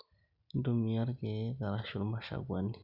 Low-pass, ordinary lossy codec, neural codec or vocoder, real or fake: 5.4 kHz; none; none; real